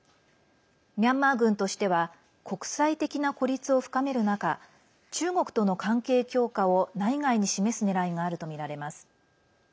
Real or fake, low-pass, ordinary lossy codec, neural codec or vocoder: real; none; none; none